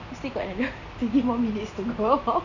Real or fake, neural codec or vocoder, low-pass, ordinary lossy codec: real; none; 7.2 kHz; none